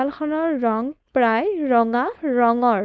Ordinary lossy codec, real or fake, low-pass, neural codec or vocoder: none; fake; none; codec, 16 kHz, 4.8 kbps, FACodec